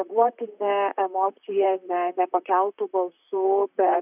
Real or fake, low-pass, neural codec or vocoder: fake; 3.6 kHz; vocoder, 44.1 kHz, 128 mel bands, Pupu-Vocoder